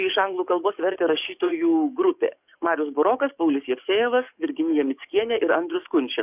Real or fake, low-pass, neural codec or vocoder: fake; 3.6 kHz; codec, 44.1 kHz, 7.8 kbps, DAC